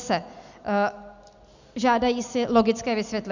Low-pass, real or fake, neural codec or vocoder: 7.2 kHz; real; none